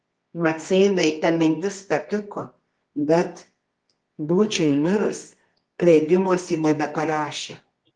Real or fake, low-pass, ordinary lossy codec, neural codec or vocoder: fake; 9.9 kHz; Opus, 24 kbps; codec, 24 kHz, 0.9 kbps, WavTokenizer, medium music audio release